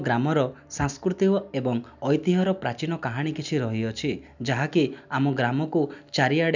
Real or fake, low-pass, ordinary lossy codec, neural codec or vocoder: real; 7.2 kHz; none; none